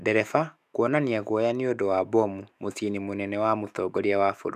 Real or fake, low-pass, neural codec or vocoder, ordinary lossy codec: fake; 14.4 kHz; autoencoder, 48 kHz, 128 numbers a frame, DAC-VAE, trained on Japanese speech; none